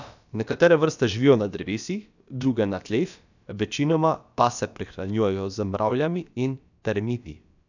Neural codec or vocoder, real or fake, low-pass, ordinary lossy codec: codec, 16 kHz, about 1 kbps, DyCAST, with the encoder's durations; fake; 7.2 kHz; none